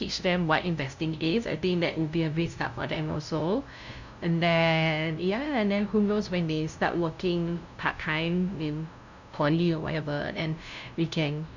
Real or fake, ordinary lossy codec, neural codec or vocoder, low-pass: fake; none; codec, 16 kHz, 0.5 kbps, FunCodec, trained on LibriTTS, 25 frames a second; 7.2 kHz